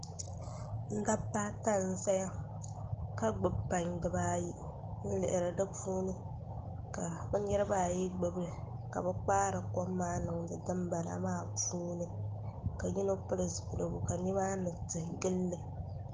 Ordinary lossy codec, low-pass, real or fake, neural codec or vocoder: Opus, 16 kbps; 7.2 kHz; real; none